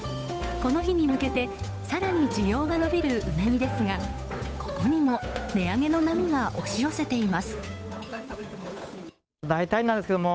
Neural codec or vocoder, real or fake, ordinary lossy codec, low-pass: codec, 16 kHz, 8 kbps, FunCodec, trained on Chinese and English, 25 frames a second; fake; none; none